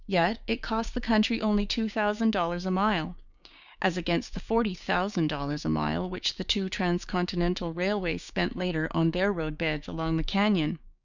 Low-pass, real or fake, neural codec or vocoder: 7.2 kHz; fake; codec, 16 kHz, 6 kbps, DAC